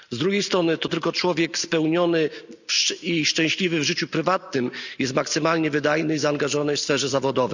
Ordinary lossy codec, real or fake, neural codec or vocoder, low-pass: none; real; none; 7.2 kHz